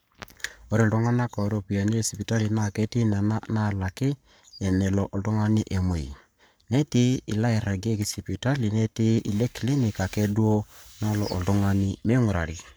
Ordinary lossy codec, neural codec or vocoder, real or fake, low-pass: none; codec, 44.1 kHz, 7.8 kbps, Pupu-Codec; fake; none